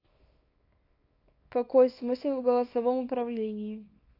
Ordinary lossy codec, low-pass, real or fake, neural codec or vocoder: AAC, 32 kbps; 5.4 kHz; fake; codec, 24 kHz, 0.9 kbps, WavTokenizer, small release